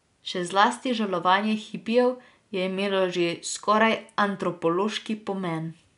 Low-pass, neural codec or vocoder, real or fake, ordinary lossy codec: 10.8 kHz; none; real; none